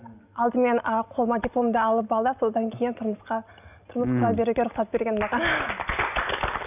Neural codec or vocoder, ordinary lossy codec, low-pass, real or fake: none; none; 3.6 kHz; real